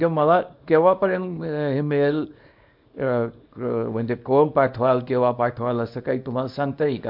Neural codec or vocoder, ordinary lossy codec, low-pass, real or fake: codec, 24 kHz, 0.9 kbps, WavTokenizer, small release; MP3, 48 kbps; 5.4 kHz; fake